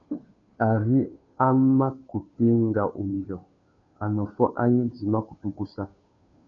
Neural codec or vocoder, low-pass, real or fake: codec, 16 kHz, 2 kbps, FunCodec, trained on Chinese and English, 25 frames a second; 7.2 kHz; fake